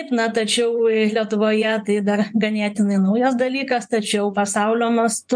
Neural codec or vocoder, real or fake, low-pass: vocoder, 24 kHz, 100 mel bands, Vocos; fake; 9.9 kHz